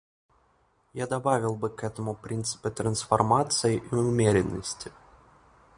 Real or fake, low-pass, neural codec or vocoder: real; 9.9 kHz; none